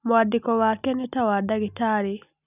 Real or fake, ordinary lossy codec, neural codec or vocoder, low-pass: real; none; none; 3.6 kHz